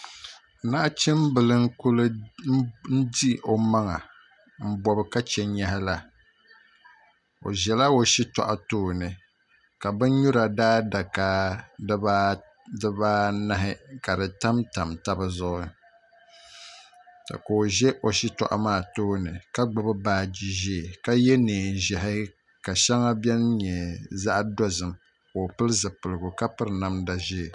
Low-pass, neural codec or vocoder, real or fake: 10.8 kHz; none; real